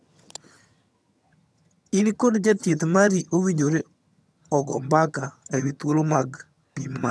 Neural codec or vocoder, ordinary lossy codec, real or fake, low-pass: vocoder, 22.05 kHz, 80 mel bands, HiFi-GAN; none; fake; none